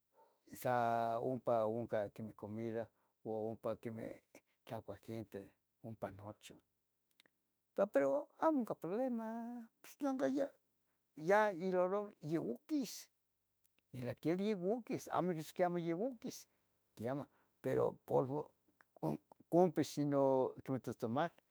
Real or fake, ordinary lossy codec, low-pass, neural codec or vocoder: fake; none; none; autoencoder, 48 kHz, 32 numbers a frame, DAC-VAE, trained on Japanese speech